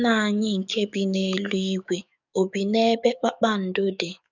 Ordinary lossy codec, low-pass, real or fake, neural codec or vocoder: none; 7.2 kHz; fake; codec, 44.1 kHz, 7.8 kbps, DAC